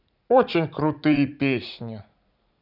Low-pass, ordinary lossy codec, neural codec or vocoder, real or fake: 5.4 kHz; none; vocoder, 44.1 kHz, 80 mel bands, Vocos; fake